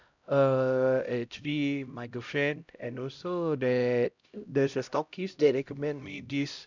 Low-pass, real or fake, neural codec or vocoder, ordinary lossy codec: 7.2 kHz; fake; codec, 16 kHz, 0.5 kbps, X-Codec, HuBERT features, trained on LibriSpeech; none